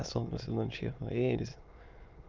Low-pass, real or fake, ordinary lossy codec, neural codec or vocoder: 7.2 kHz; fake; Opus, 24 kbps; autoencoder, 22.05 kHz, a latent of 192 numbers a frame, VITS, trained on many speakers